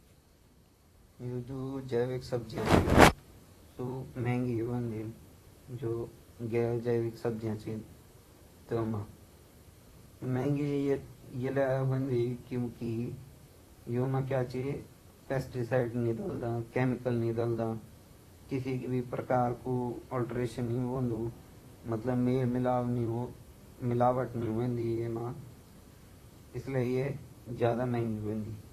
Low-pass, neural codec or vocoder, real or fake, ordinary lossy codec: 14.4 kHz; vocoder, 44.1 kHz, 128 mel bands, Pupu-Vocoder; fake; AAC, 48 kbps